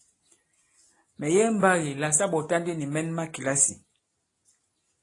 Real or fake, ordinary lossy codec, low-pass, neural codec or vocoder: real; AAC, 32 kbps; 10.8 kHz; none